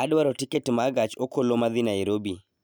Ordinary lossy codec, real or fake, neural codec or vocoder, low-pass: none; real; none; none